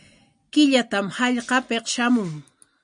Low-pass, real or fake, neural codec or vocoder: 9.9 kHz; real; none